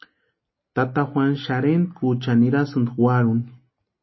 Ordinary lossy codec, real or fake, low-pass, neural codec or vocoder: MP3, 24 kbps; real; 7.2 kHz; none